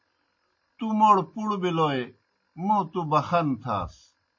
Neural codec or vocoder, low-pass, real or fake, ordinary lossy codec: none; 7.2 kHz; real; MP3, 32 kbps